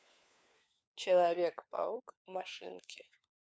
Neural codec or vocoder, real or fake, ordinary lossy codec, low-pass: codec, 16 kHz, 4 kbps, FunCodec, trained on LibriTTS, 50 frames a second; fake; none; none